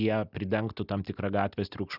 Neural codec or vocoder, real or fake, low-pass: none; real; 5.4 kHz